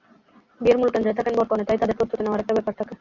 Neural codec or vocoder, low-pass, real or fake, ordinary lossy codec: none; 7.2 kHz; real; Opus, 64 kbps